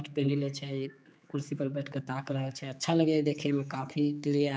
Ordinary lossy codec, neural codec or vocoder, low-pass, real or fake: none; codec, 16 kHz, 4 kbps, X-Codec, HuBERT features, trained on general audio; none; fake